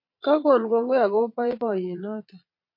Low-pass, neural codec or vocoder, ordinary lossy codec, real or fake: 5.4 kHz; vocoder, 22.05 kHz, 80 mel bands, Vocos; MP3, 32 kbps; fake